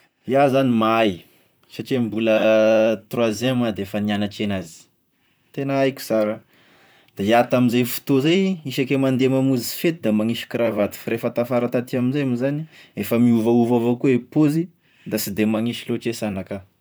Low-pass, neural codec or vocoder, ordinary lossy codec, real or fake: none; vocoder, 44.1 kHz, 128 mel bands, Pupu-Vocoder; none; fake